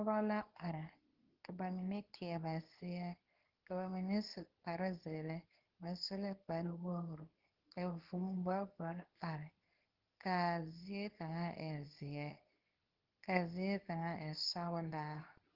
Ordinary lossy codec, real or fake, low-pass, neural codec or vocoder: Opus, 16 kbps; fake; 5.4 kHz; codec, 24 kHz, 0.9 kbps, WavTokenizer, medium speech release version 2